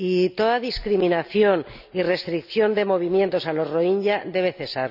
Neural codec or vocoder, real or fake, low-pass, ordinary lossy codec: none; real; 5.4 kHz; none